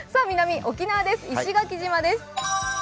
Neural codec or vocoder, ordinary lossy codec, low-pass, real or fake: none; none; none; real